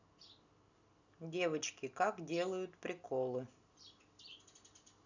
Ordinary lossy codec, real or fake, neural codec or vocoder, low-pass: none; real; none; 7.2 kHz